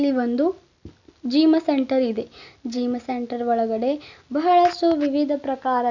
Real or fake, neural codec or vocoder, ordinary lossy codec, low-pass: real; none; none; 7.2 kHz